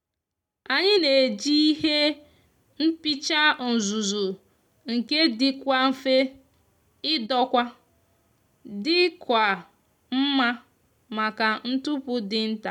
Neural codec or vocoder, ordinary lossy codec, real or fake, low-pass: none; none; real; 19.8 kHz